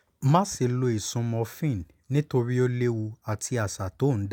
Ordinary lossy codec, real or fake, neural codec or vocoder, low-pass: none; real; none; 19.8 kHz